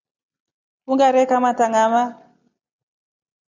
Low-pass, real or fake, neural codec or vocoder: 7.2 kHz; real; none